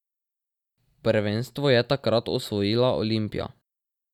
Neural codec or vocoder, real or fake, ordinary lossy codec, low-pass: none; real; none; 19.8 kHz